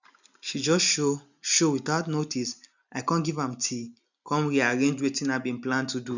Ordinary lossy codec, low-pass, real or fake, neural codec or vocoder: none; 7.2 kHz; real; none